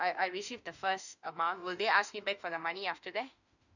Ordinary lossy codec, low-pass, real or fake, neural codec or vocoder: none; 7.2 kHz; fake; autoencoder, 48 kHz, 32 numbers a frame, DAC-VAE, trained on Japanese speech